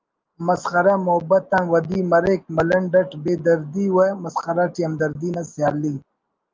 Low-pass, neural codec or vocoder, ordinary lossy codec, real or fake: 7.2 kHz; none; Opus, 24 kbps; real